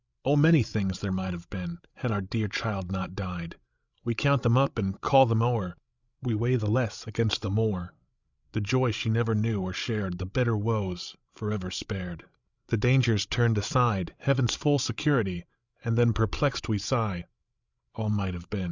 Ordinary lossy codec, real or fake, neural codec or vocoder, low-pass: Opus, 64 kbps; fake; codec, 16 kHz, 16 kbps, FreqCodec, larger model; 7.2 kHz